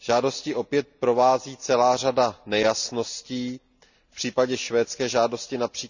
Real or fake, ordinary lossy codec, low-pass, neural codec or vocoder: real; none; 7.2 kHz; none